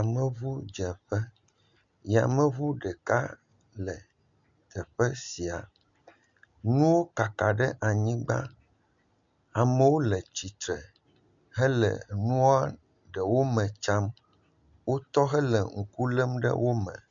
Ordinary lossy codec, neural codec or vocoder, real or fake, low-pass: MP3, 64 kbps; none; real; 7.2 kHz